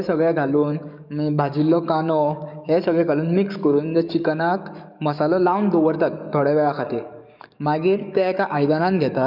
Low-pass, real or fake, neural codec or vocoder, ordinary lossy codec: 5.4 kHz; fake; codec, 44.1 kHz, 7.8 kbps, DAC; none